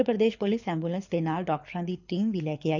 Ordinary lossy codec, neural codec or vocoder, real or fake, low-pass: none; codec, 24 kHz, 6 kbps, HILCodec; fake; 7.2 kHz